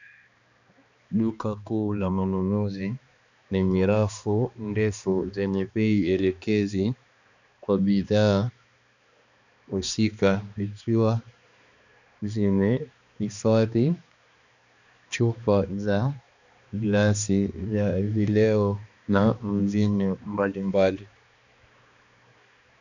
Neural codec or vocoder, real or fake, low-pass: codec, 16 kHz, 2 kbps, X-Codec, HuBERT features, trained on balanced general audio; fake; 7.2 kHz